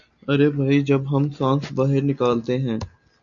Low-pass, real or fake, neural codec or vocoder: 7.2 kHz; real; none